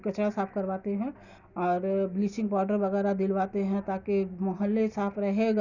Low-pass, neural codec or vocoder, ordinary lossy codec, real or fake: 7.2 kHz; none; none; real